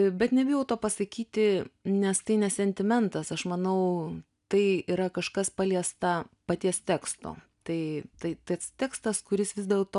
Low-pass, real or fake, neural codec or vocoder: 10.8 kHz; real; none